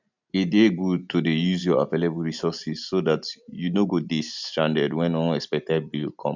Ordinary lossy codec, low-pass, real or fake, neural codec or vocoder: none; 7.2 kHz; real; none